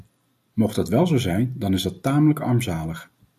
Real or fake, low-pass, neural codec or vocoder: real; 14.4 kHz; none